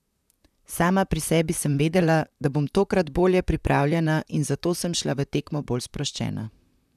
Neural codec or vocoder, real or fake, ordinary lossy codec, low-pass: vocoder, 44.1 kHz, 128 mel bands, Pupu-Vocoder; fake; none; 14.4 kHz